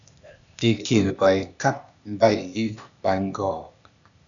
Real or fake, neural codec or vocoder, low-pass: fake; codec, 16 kHz, 0.8 kbps, ZipCodec; 7.2 kHz